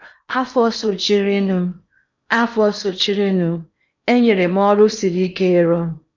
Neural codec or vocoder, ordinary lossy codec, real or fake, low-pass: codec, 16 kHz in and 24 kHz out, 0.8 kbps, FocalCodec, streaming, 65536 codes; none; fake; 7.2 kHz